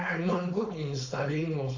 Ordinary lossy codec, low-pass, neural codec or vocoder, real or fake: AAC, 48 kbps; 7.2 kHz; codec, 16 kHz, 4.8 kbps, FACodec; fake